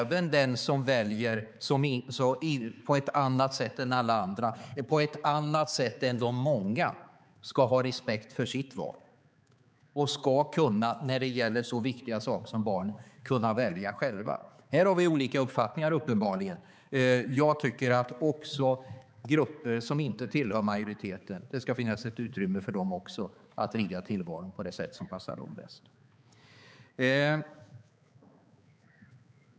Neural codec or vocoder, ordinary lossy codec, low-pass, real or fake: codec, 16 kHz, 4 kbps, X-Codec, HuBERT features, trained on balanced general audio; none; none; fake